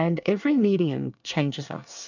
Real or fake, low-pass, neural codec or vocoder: fake; 7.2 kHz; codec, 24 kHz, 1 kbps, SNAC